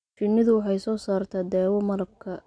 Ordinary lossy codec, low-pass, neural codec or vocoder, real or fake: none; 9.9 kHz; none; real